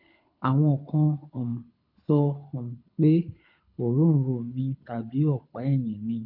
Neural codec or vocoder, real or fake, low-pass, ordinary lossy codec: codec, 24 kHz, 6 kbps, HILCodec; fake; 5.4 kHz; MP3, 48 kbps